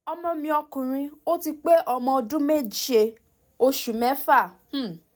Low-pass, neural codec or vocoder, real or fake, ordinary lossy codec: none; none; real; none